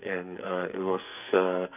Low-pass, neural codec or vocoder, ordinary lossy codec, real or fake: 3.6 kHz; codec, 44.1 kHz, 2.6 kbps, SNAC; none; fake